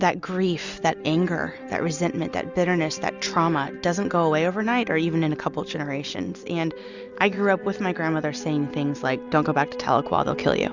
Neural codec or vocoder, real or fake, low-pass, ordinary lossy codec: none; real; 7.2 kHz; Opus, 64 kbps